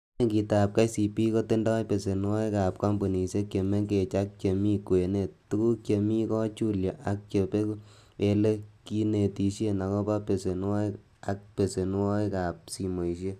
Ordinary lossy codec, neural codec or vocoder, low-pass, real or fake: Opus, 64 kbps; none; 14.4 kHz; real